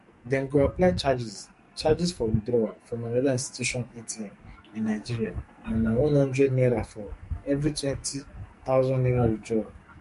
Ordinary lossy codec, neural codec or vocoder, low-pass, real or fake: MP3, 48 kbps; codec, 44.1 kHz, 2.6 kbps, SNAC; 14.4 kHz; fake